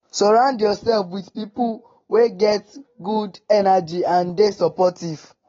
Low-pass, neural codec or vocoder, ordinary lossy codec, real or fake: 7.2 kHz; none; AAC, 24 kbps; real